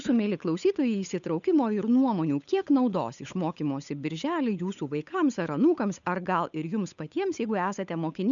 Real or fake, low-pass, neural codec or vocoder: fake; 7.2 kHz; codec, 16 kHz, 8 kbps, FunCodec, trained on Chinese and English, 25 frames a second